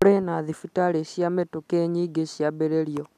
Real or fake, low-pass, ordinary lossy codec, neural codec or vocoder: real; 14.4 kHz; none; none